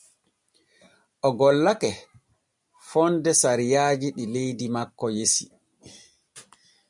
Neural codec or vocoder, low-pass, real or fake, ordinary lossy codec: none; 10.8 kHz; real; MP3, 96 kbps